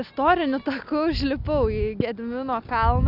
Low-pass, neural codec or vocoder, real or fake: 5.4 kHz; none; real